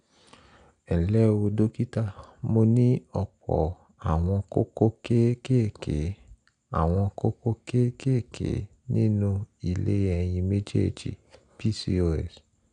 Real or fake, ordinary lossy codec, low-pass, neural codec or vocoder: real; none; 9.9 kHz; none